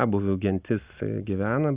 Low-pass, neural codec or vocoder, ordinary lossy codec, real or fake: 3.6 kHz; none; Opus, 64 kbps; real